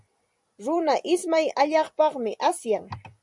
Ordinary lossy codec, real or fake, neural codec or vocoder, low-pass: MP3, 96 kbps; fake; vocoder, 44.1 kHz, 128 mel bands every 256 samples, BigVGAN v2; 10.8 kHz